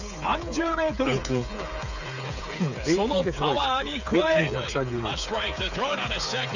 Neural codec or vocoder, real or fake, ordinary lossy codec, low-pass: codec, 16 kHz, 8 kbps, FreqCodec, smaller model; fake; none; 7.2 kHz